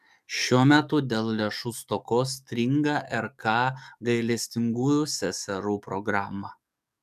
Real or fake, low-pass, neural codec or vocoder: fake; 14.4 kHz; codec, 44.1 kHz, 7.8 kbps, DAC